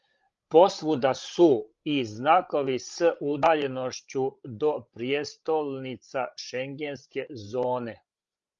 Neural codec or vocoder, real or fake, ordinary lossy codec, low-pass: codec, 16 kHz, 16 kbps, FreqCodec, larger model; fake; Opus, 32 kbps; 7.2 kHz